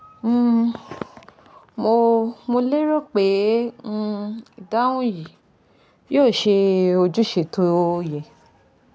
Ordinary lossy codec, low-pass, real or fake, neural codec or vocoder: none; none; real; none